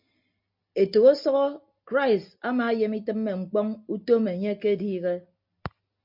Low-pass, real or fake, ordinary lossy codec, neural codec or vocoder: 5.4 kHz; real; MP3, 48 kbps; none